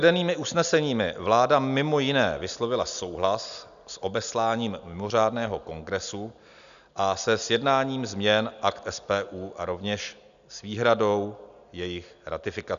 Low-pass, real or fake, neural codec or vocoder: 7.2 kHz; real; none